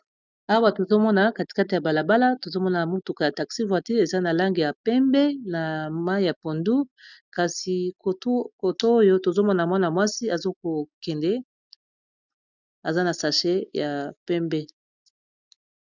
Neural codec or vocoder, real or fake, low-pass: none; real; 7.2 kHz